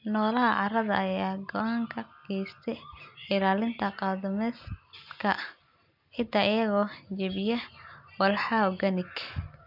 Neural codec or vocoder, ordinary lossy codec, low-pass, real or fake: none; MP3, 48 kbps; 5.4 kHz; real